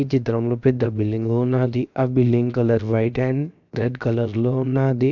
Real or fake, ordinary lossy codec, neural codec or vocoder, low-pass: fake; none; codec, 16 kHz, 0.7 kbps, FocalCodec; 7.2 kHz